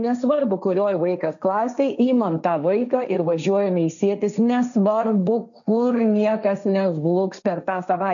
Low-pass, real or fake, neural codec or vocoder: 7.2 kHz; fake; codec, 16 kHz, 1.1 kbps, Voila-Tokenizer